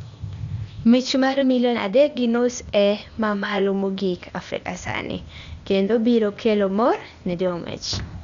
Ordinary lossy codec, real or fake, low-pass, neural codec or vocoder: Opus, 64 kbps; fake; 7.2 kHz; codec, 16 kHz, 0.8 kbps, ZipCodec